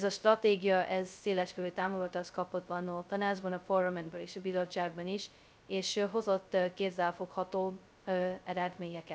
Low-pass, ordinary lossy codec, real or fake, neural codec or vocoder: none; none; fake; codec, 16 kHz, 0.2 kbps, FocalCodec